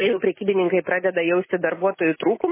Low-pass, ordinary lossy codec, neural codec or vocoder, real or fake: 3.6 kHz; MP3, 16 kbps; none; real